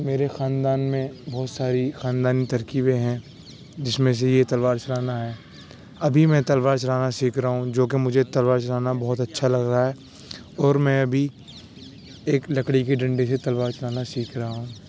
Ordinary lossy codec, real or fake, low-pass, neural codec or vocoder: none; real; none; none